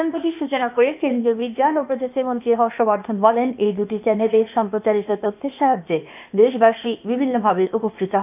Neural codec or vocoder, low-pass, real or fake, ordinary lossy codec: codec, 16 kHz, 0.8 kbps, ZipCodec; 3.6 kHz; fake; none